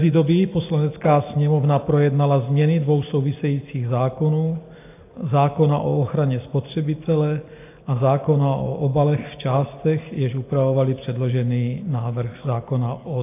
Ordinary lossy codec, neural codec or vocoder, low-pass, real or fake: AAC, 24 kbps; none; 3.6 kHz; real